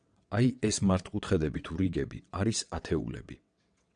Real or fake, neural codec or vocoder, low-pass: fake; vocoder, 22.05 kHz, 80 mel bands, WaveNeXt; 9.9 kHz